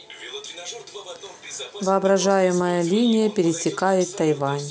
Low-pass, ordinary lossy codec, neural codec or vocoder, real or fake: none; none; none; real